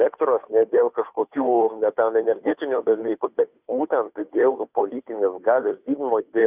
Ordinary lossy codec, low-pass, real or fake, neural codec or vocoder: Opus, 64 kbps; 3.6 kHz; fake; codec, 16 kHz, 2 kbps, FunCodec, trained on Chinese and English, 25 frames a second